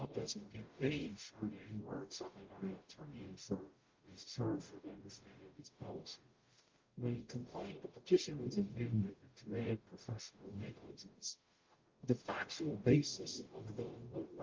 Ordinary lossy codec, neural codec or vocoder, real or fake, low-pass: Opus, 32 kbps; codec, 44.1 kHz, 0.9 kbps, DAC; fake; 7.2 kHz